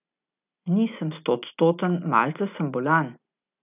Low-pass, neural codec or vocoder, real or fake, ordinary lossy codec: 3.6 kHz; none; real; none